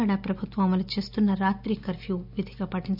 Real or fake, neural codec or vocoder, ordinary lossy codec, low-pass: real; none; none; 5.4 kHz